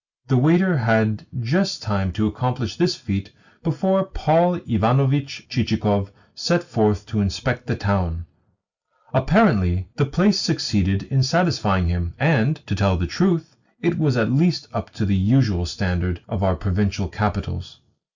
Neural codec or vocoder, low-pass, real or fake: none; 7.2 kHz; real